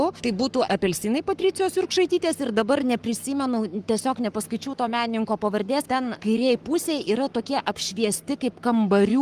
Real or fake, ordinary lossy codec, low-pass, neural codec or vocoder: fake; Opus, 24 kbps; 14.4 kHz; codec, 44.1 kHz, 7.8 kbps, Pupu-Codec